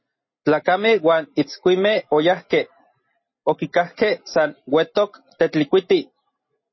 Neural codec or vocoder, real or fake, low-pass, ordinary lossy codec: none; real; 7.2 kHz; MP3, 24 kbps